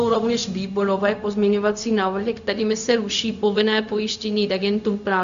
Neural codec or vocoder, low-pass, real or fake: codec, 16 kHz, 0.4 kbps, LongCat-Audio-Codec; 7.2 kHz; fake